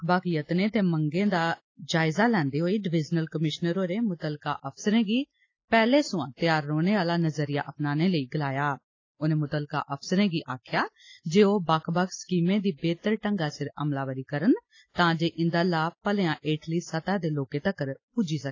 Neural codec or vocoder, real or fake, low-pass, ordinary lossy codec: none; real; 7.2 kHz; AAC, 32 kbps